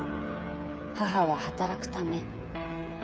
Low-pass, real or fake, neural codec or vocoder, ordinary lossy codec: none; fake; codec, 16 kHz, 8 kbps, FreqCodec, smaller model; none